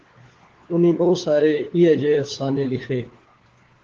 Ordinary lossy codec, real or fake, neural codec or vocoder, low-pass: Opus, 16 kbps; fake; codec, 16 kHz, 4 kbps, FunCodec, trained on LibriTTS, 50 frames a second; 7.2 kHz